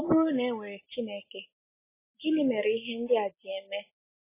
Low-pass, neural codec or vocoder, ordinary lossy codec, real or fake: 3.6 kHz; none; MP3, 16 kbps; real